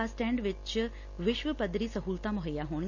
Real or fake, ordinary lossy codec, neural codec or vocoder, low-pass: real; none; none; 7.2 kHz